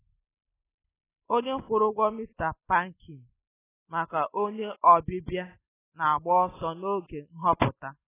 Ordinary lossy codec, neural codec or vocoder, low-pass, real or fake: AAC, 16 kbps; none; 3.6 kHz; real